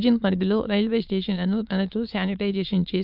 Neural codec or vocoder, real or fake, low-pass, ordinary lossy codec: autoencoder, 22.05 kHz, a latent of 192 numbers a frame, VITS, trained on many speakers; fake; 5.4 kHz; none